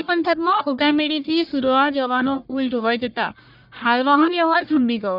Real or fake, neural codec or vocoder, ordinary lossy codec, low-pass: fake; codec, 44.1 kHz, 1.7 kbps, Pupu-Codec; none; 5.4 kHz